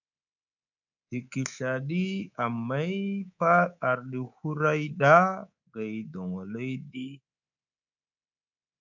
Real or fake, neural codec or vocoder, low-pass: fake; codec, 24 kHz, 3.1 kbps, DualCodec; 7.2 kHz